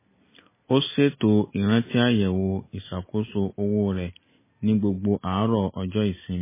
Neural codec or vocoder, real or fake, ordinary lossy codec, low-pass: none; real; MP3, 16 kbps; 3.6 kHz